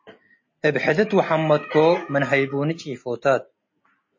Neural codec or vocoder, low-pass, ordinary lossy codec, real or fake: none; 7.2 kHz; MP3, 32 kbps; real